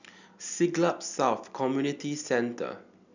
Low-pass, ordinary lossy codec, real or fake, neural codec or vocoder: 7.2 kHz; none; real; none